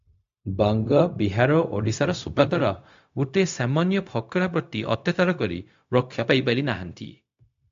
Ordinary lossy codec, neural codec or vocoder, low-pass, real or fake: AAC, 64 kbps; codec, 16 kHz, 0.4 kbps, LongCat-Audio-Codec; 7.2 kHz; fake